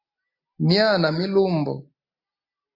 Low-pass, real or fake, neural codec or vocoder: 5.4 kHz; real; none